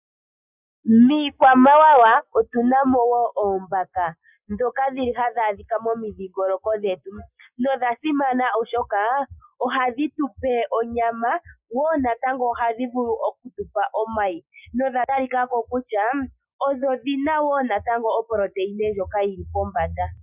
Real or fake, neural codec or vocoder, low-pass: real; none; 3.6 kHz